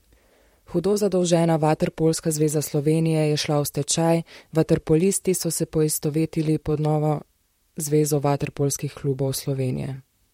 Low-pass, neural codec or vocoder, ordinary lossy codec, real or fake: 19.8 kHz; vocoder, 44.1 kHz, 128 mel bands, Pupu-Vocoder; MP3, 64 kbps; fake